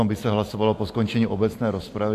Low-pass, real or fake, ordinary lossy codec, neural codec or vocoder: 14.4 kHz; real; AAC, 64 kbps; none